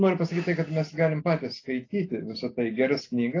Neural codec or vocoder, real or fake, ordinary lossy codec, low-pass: none; real; AAC, 32 kbps; 7.2 kHz